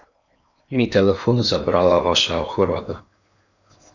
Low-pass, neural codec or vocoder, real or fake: 7.2 kHz; codec, 16 kHz in and 24 kHz out, 0.8 kbps, FocalCodec, streaming, 65536 codes; fake